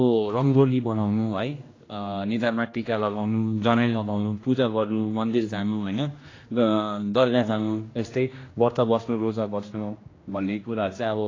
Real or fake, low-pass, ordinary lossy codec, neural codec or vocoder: fake; 7.2 kHz; AAC, 32 kbps; codec, 16 kHz, 1 kbps, X-Codec, HuBERT features, trained on general audio